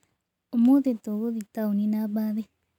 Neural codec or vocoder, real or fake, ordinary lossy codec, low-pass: none; real; none; 19.8 kHz